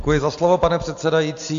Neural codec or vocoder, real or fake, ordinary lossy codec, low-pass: none; real; AAC, 64 kbps; 7.2 kHz